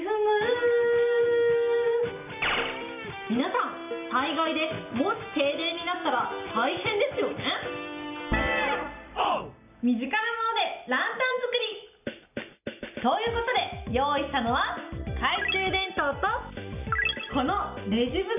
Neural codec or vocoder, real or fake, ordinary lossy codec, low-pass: none; real; none; 3.6 kHz